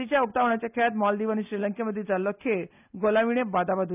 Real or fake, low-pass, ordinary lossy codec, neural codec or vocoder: real; 3.6 kHz; none; none